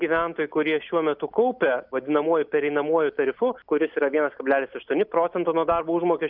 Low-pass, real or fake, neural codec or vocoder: 5.4 kHz; real; none